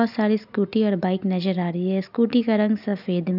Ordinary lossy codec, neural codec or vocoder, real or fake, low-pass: none; none; real; 5.4 kHz